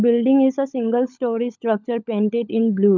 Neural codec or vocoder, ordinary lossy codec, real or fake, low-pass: codec, 16 kHz, 16 kbps, FunCodec, trained on LibriTTS, 50 frames a second; none; fake; 7.2 kHz